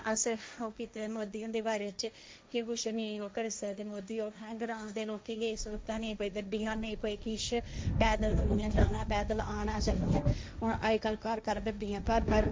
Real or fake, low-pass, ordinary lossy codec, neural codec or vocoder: fake; none; none; codec, 16 kHz, 1.1 kbps, Voila-Tokenizer